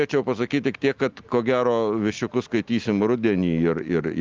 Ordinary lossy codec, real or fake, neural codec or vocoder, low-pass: Opus, 32 kbps; real; none; 7.2 kHz